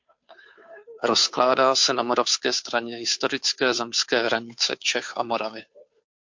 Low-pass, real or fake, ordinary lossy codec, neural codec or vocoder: 7.2 kHz; fake; MP3, 48 kbps; codec, 16 kHz, 2 kbps, FunCodec, trained on Chinese and English, 25 frames a second